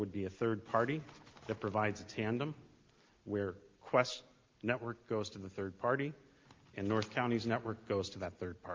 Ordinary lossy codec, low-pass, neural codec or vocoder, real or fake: Opus, 32 kbps; 7.2 kHz; none; real